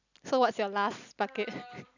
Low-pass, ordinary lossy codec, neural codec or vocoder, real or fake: 7.2 kHz; none; none; real